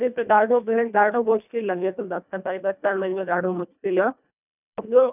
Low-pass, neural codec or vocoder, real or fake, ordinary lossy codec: 3.6 kHz; codec, 24 kHz, 1.5 kbps, HILCodec; fake; none